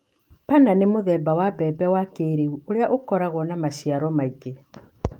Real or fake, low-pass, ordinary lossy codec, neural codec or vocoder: fake; 19.8 kHz; Opus, 32 kbps; autoencoder, 48 kHz, 128 numbers a frame, DAC-VAE, trained on Japanese speech